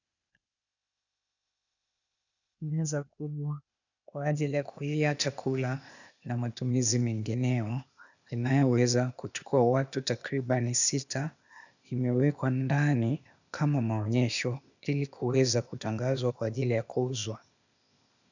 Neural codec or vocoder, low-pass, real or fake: codec, 16 kHz, 0.8 kbps, ZipCodec; 7.2 kHz; fake